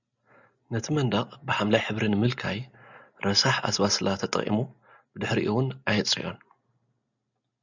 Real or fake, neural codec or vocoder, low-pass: real; none; 7.2 kHz